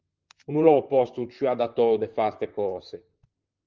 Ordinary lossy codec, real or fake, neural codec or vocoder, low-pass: Opus, 32 kbps; fake; vocoder, 44.1 kHz, 128 mel bands, Pupu-Vocoder; 7.2 kHz